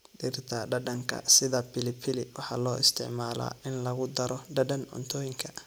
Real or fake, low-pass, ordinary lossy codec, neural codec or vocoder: real; none; none; none